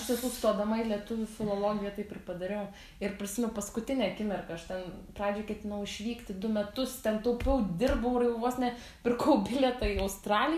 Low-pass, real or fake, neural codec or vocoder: 14.4 kHz; real; none